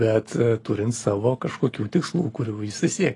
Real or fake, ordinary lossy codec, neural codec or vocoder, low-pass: real; AAC, 32 kbps; none; 10.8 kHz